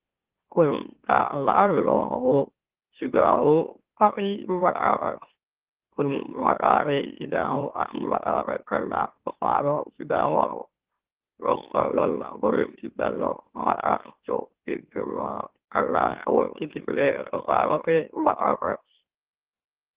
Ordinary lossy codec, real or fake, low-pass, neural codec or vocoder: Opus, 16 kbps; fake; 3.6 kHz; autoencoder, 44.1 kHz, a latent of 192 numbers a frame, MeloTTS